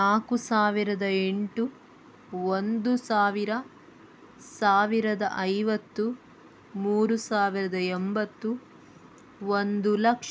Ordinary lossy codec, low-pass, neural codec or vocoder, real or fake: none; none; none; real